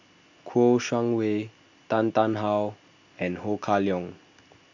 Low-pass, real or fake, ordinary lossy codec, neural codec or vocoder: 7.2 kHz; real; none; none